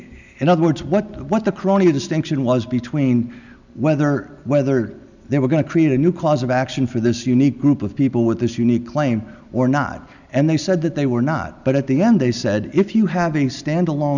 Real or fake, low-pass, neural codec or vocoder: real; 7.2 kHz; none